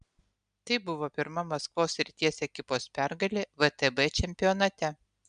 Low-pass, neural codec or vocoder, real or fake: 9.9 kHz; none; real